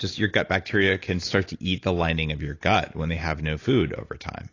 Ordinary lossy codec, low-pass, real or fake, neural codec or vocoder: AAC, 32 kbps; 7.2 kHz; real; none